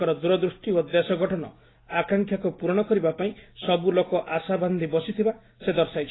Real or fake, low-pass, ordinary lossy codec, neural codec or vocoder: real; 7.2 kHz; AAC, 16 kbps; none